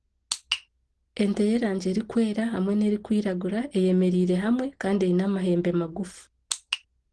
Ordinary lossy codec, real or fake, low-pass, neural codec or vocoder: Opus, 16 kbps; real; 9.9 kHz; none